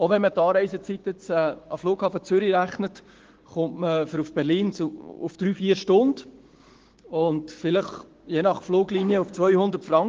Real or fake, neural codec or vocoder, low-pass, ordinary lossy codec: fake; codec, 16 kHz, 6 kbps, DAC; 7.2 kHz; Opus, 16 kbps